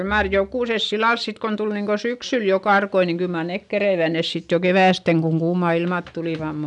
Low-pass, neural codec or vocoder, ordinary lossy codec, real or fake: 10.8 kHz; none; none; real